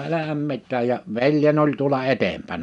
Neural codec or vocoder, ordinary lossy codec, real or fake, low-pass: none; none; real; 10.8 kHz